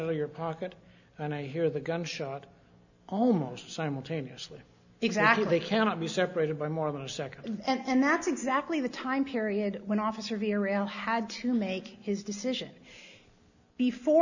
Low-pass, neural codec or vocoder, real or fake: 7.2 kHz; none; real